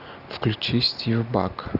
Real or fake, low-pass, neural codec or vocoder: real; 5.4 kHz; none